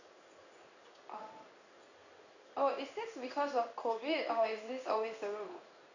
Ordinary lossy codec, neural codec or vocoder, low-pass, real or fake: none; codec, 16 kHz in and 24 kHz out, 1 kbps, XY-Tokenizer; 7.2 kHz; fake